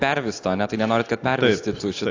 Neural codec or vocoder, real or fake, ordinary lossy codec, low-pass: none; real; AAC, 48 kbps; 7.2 kHz